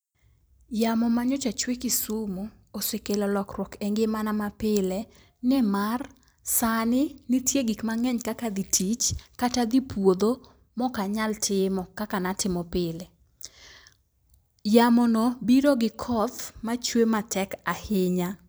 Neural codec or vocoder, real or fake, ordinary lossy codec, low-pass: vocoder, 44.1 kHz, 128 mel bands every 256 samples, BigVGAN v2; fake; none; none